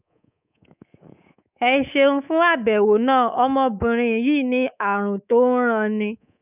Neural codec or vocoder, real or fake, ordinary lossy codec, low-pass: codec, 24 kHz, 3.1 kbps, DualCodec; fake; none; 3.6 kHz